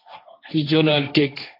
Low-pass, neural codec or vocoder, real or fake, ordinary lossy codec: 5.4 kHz; codec, 16 kHz, 1.1 kbps, Voila-Tokenizer; fake; AAC, 32 kbps